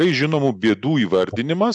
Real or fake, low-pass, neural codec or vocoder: real; 9.9 kHz; none